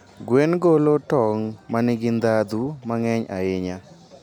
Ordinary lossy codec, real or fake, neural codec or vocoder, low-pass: none; real; none; 19.8 kHz